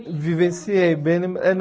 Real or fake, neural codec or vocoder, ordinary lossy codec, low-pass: real; none; none; none